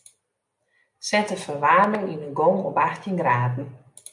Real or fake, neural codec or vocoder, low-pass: real; none; 10.8 kHz